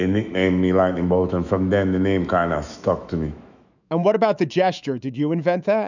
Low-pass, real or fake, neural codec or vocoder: 7.2 kHz; fake; autoencoder, 48 kHz, 128 numbers a frame, DAC-VAE, trained on Japanese speech